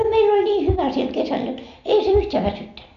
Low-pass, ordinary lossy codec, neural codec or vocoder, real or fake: 7.2 kHz; none; none; real